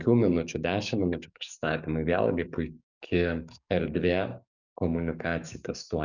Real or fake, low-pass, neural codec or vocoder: fake; 7.2 kHz; codec, 44.1 kHz, 7.8 kbps, DAC